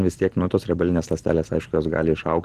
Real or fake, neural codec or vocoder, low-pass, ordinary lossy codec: real; none; 14.4 kHz; Opus, 16 kbps